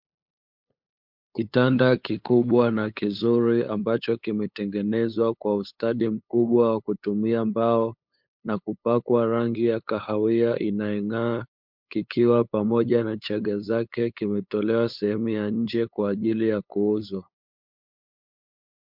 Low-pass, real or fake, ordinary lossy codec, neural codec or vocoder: 5.4 kHz; fake; MP3, 48 kbps; codec, 16 kHz, 8 kbps, FunCodec, trained on LibriTTS, 25 frames a second